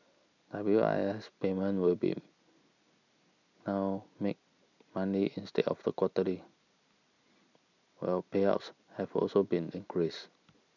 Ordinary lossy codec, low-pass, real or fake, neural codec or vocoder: none; 7.2 kHz; real; none